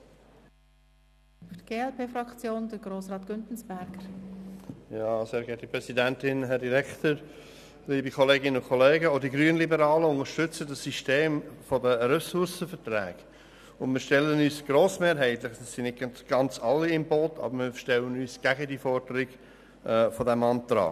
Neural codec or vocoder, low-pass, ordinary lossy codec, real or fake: none; 14.4 kHz; none; real